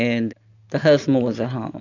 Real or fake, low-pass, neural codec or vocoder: real; 7.2 kHz; none